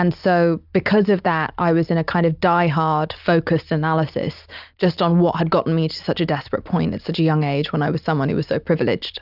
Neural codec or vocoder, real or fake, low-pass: none; real; 5.4 kHz